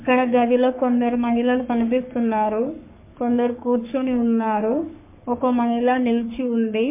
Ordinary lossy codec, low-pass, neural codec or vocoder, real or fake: MP3, 24 kbps; 3.6 kHz; codec, 44.1 kHz, 3.4 kbps, Pupu-Codec; fake